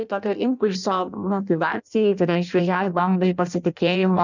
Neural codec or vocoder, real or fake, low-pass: codec, 16 kHz in and 24 kHz out, 0.6 kbps, FireRedTTS-2 codec; fake; 7.2 kHz